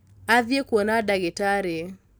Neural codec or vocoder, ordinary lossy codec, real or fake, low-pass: none; none; real; none